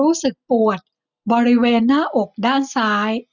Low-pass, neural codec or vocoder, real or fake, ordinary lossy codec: 7.2 kHz; none; real; none